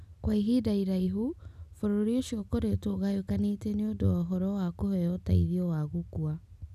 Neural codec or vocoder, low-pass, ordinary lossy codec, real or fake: none; 14.4 kHz; none; real